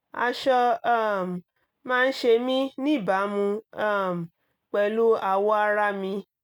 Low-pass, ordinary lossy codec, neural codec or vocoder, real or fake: 19.8 kHz; none; none; real